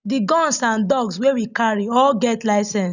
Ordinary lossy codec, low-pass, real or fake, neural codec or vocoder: none; 7.2 kHz; real; none